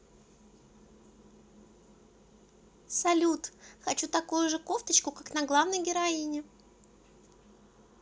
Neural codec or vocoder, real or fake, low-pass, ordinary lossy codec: none; real; none; none